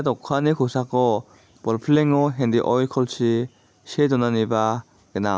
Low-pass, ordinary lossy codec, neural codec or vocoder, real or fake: none; none; none; real